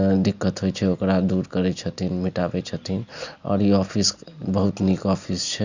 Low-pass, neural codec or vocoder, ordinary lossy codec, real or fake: 7.2 kHz; none; Opus, 64 kbps; real